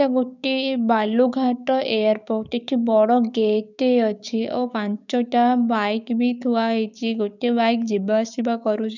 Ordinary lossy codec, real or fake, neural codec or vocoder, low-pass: none; fake; codec, 16 kHz, 6 kbps, DAC; 7.2 kHz